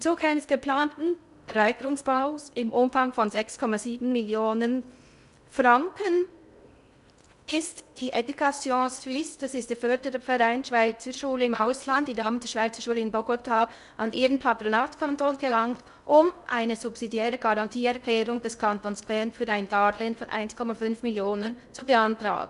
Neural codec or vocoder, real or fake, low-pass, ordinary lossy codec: codec, 16 kHz in and 24 kHz out, 0.6 kbps, FocalCodec, streaming, 2048 codes; fake; 10.8 kHz; none